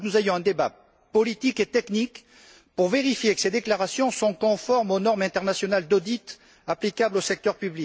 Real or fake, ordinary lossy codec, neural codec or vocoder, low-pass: real; none; none; none